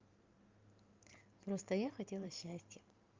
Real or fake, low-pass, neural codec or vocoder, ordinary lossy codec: fake; 7.2 kHz; vocoder, 44.1 kHz, 128 mel bands every 512 samples, BigVGAN v2; Opus, 24 kbps